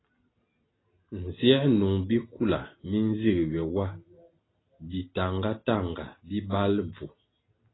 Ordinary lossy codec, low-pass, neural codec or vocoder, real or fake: AAC, 16 kbps; 7.2 kHz; none; real